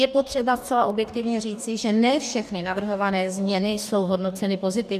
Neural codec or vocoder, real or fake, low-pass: codec, 44.1 kHz, 2.6 kbps, DAC; fake; 14.4 kHz